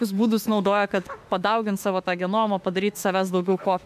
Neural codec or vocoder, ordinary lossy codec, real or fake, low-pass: autoencoder, 48 kHz, 32 numbers a frame, DAC-VAE, trained on Japanese speech; MP3, 96 kbps; fake; 14.4 kHz